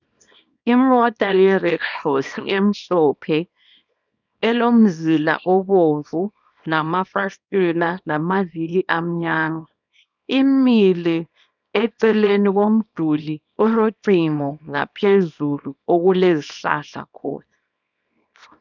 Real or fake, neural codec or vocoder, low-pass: fake; codec, 24 kHz, 0.9 kbps, WavTokenizer, small release; 7.2 kHz